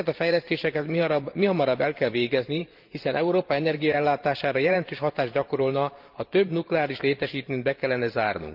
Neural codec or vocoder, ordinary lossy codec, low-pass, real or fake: vocoder, 44.1 kHz, 128 mel bands every 512 samples, BigVGAN v2; Opus, 32 kbps; 5.4 kHz; fake